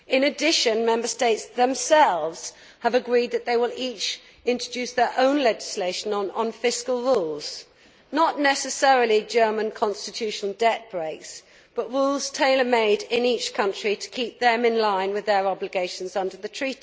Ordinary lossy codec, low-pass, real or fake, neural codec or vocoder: none; none; real; none